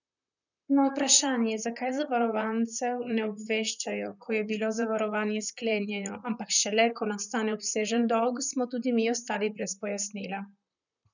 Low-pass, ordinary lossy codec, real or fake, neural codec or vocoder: 7.2 kHz; none; fake; vocoder, 44.1 kHz, 128 mel bands, Pupu-Vocoder